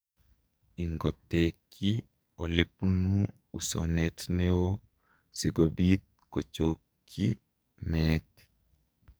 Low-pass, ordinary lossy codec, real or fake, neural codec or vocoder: none; none; fake; codec, 44.1 kHz, 2.6 kbps, SNAC